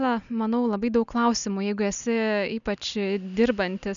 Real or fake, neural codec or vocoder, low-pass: real; none; 7.2 kHz